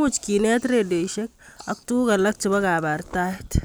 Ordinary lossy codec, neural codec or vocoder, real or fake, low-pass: none; none; real; none